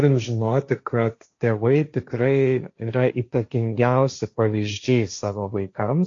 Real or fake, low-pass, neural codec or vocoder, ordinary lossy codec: fake; 7.2 kHz; codec, 16 kHz, 1.1 kbps, Voila-Tokenizer; AAC, 48 kbps